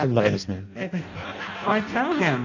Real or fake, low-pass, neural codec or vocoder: fake; 7.2 kHz; codec, 16 kHz in and 24 kHz out, 0.6 kbps, FireRedTTS-2 codec